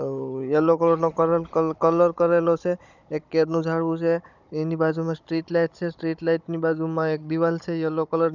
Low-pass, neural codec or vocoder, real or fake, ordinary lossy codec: 7.2 kHz; codec, 16 kHz, 16 kbps, FunCodec, trained on Chinese and English, 50 frames a second; fake; none